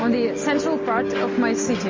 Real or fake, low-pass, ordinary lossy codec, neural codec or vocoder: real; 7.2 kHz; MP3, 32 kbps; none